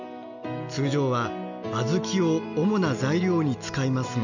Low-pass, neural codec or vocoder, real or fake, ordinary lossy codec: 7.2 kHz; none; real; none